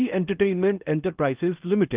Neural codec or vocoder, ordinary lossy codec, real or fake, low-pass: codec, 16 kHz in and 24 kHz out, 0.8 kbps, FocalCodec, streaming, 65536 codes; Opus, 24 kbps; fake; 3.6 kHz